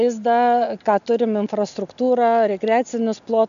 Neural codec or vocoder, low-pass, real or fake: none; 7.2 kHz; real